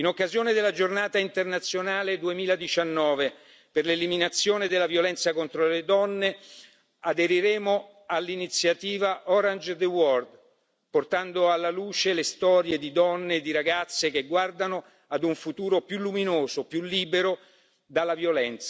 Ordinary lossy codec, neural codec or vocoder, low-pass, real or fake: none; none; none; real